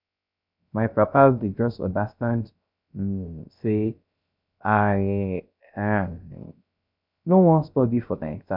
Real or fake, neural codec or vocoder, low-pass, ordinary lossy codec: fake; codec, 16 kHz, 0.3 kbps, FocalCodec; 5.4 kHz; none